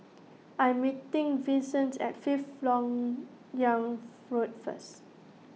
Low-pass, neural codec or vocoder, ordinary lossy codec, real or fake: none; none; none; real